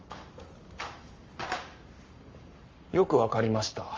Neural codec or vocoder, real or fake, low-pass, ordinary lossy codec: codec, 44.1 kHz, 7.8 kbps, Pupu-Codec; fake; 7.2 kHz; Opus, 32 kbps